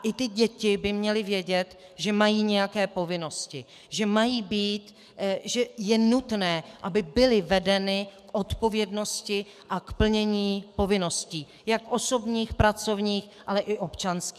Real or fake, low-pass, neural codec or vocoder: fake; 14.4 kHz; codec, 44.1 kHz, 7.8 kbps, DAC